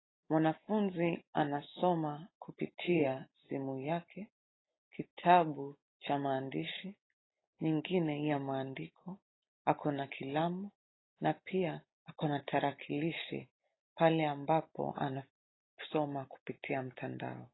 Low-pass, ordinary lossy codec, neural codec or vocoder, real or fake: 7.2 kHz; AAC, 16 kbps; none; real